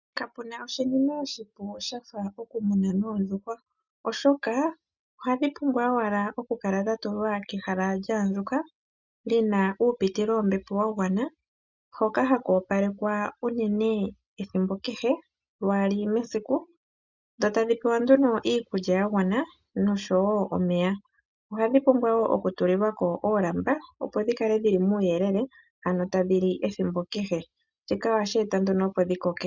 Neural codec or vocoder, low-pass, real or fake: none; 7.2 kHz; real